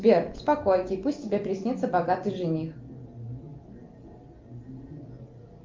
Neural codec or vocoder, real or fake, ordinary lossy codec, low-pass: none; real; Opus, 24 kbps; 7.2 kHz